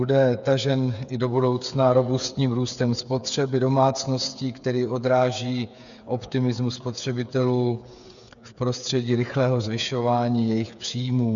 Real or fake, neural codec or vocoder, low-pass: fake; codec, 16 kHz, 8 kbps, FreqCodec, smaller model; 7.2 kHz